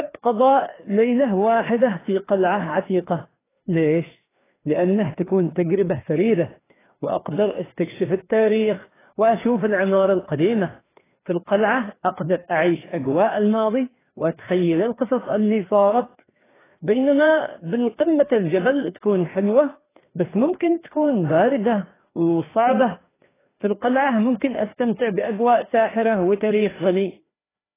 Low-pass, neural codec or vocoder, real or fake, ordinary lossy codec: 3.6 kHz; codec, 16 kHz, 2 kbps, FreqCodec, larger model; fake; AAC, 16 kbps